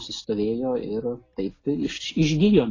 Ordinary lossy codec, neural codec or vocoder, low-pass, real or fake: AAC, 32 kbps; none; 7.2 kHz; real